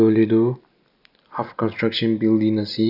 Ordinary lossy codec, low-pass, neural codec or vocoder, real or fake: none; 5.4 kHz; none; real